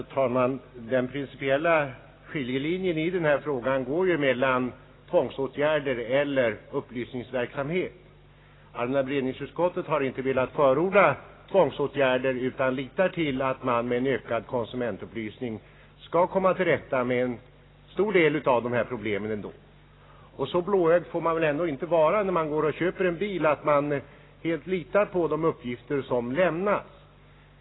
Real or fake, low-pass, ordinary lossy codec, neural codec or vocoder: real; 7.2 kHz; AAC, 16 kbps; none